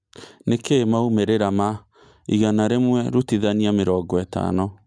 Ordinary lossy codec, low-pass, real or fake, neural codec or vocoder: none; 9.9 kHz; real; none